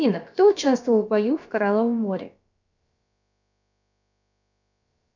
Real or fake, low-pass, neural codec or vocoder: fake; 7.2 kHz; codec, 16 kHz, about 1 kbps, DyCAST, with the encoder's durations